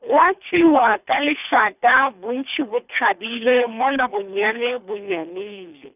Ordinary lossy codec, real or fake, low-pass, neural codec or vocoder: none; fake; 3.6 kHz; codec, 24 kHz, 3 kbps, HILCodec